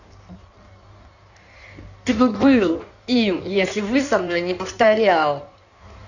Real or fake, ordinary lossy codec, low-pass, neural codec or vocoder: fake; none; 7.2 kHz; codec, 16 kHz in and 24 kHz out, 1.1 kbps, FireRedTTS-2 codec